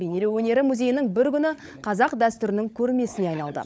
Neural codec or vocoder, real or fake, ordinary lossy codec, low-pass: codec, 16 kHz, 16 kbps, FunCodec, trained on LibriTTS, 50 frames a second; fake; none; none